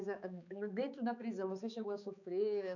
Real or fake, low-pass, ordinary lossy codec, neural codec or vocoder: fake; 7.2 kHz; none; codec, 16 kHz, 4 kbps, X-Codec, HuBERT features, trained on balanced general audio